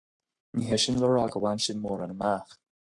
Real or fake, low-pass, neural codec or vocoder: fake; 10.8 kHz; codec, 44.1 kHz, 7.8 kbps, Pupu-Codec